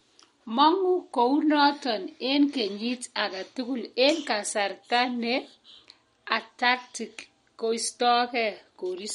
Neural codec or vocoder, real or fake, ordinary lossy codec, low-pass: none; real; MP3, 48 kbps; 14.4 kHz